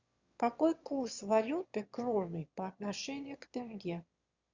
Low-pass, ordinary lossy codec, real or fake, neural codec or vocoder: 7.2 kHz; Opus, 64 kbps; fake; autoencoder, 22.05 kHz, a latent of 192 numbers a frame, VITS, trained on one speaker